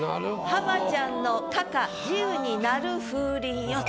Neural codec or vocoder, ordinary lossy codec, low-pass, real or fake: none; none; none; real